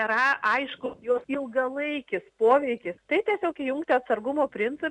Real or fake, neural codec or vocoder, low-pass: real; none; 10.8 kHz